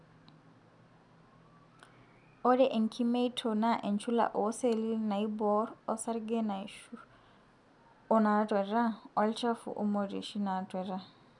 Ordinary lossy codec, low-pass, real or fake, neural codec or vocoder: none; 10.8 kHz; real; none